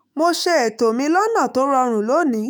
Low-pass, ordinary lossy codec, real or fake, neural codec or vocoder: none; none; fake; autoencoder, 48 kHz, 128 numbers a frame, DAC-VAE, trained on Japanese speech